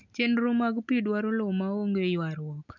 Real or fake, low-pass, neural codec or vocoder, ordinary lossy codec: real; 7.2 kHz; none; none